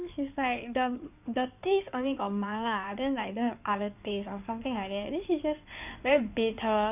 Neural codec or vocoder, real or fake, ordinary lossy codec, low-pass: codec, 16 kHz in and 24 kHz out, 2.2 kbps, FireRedTTS-2 codec; fake; none; 3.6 kHz